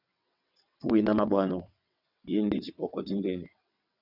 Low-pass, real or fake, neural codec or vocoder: 5.4 kHz; fake; vocoder, 22.05 kHz, 80 mel bands, WaveNeXt